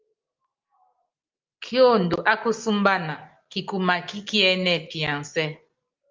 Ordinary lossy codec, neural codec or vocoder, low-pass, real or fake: Opus, 32 kbps; none; 7.2 kHz; real